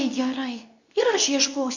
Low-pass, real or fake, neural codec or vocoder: 7.2 kHz; fake; codec, 24 kHz, 0.9 kbps, WavTokenizer, medium speech release version 2